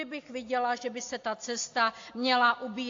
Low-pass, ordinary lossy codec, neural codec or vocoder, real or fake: 7.2 kHz; AAC, 48 kbps; none; real